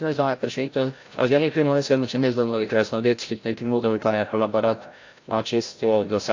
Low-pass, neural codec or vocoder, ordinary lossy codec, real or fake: 7.2 kHz; codec, 16 kHz, 0.5 kbps, FreqCodec, larger model; AAC, 48 kbps; fake